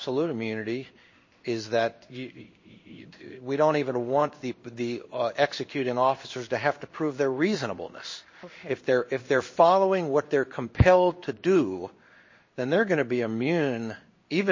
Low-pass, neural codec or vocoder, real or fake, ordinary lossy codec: 7.2 kHz; codec, 16 kHz in and 24 kHz out, 1 kbps, XY-Tokenizer; fake; MP3, 32 kbps